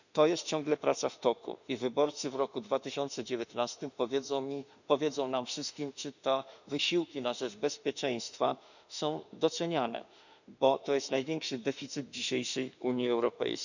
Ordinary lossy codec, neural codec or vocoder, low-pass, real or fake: none; autoencoder, 48 kHz, 32 numbers a frame, DAC-VAE, trained on Japanese speech; 7.2 kHz; fake